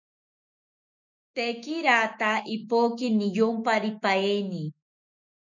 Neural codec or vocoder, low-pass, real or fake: autoencoder, 48 kHz, 128 numbers a frame, DAC-VAE, trained on Japanese speech; 7.2 kHz; fake